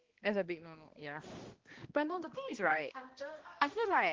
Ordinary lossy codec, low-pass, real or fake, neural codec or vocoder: Opus, 16 kbps; 7.2 kHz; fake; codec, 16 kHz, 1 kbps, X-Codec, HuBERT features, trained on balanced general audio